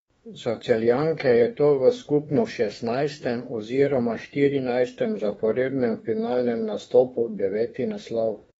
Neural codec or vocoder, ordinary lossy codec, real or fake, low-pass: autoencoder, 48 kHz, 32 numbers a frame, DAC-VAE, trained on Japanese speech; AAC, 24 kbps; fake; 19.8 kHz